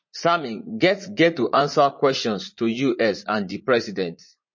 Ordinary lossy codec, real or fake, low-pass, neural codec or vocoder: MP3, 32 kbps; fake; 7.2 kHz; vocoder, 44.1 kHz, 80 mel bands, Vocos